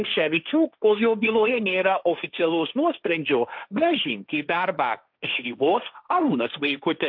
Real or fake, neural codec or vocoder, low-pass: fake; codec, 16 kHz, 1.1 kbps, Voila-Tokenizer; 5.4 kHz